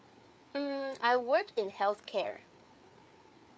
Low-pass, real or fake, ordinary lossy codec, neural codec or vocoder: none; fake; none; codec, 16 kHz, 4 kbps, FreqCodec, larger model